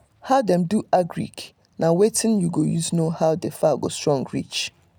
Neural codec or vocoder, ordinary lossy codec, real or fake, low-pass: none; none; real; none